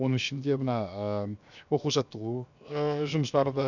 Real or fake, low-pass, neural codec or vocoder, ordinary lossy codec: fake; 7.2 kHz; codec, 16 kHz, 0.7 kbps, FocalCodec; none